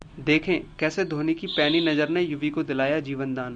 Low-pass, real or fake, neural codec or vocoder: 9.9 kHz; real; none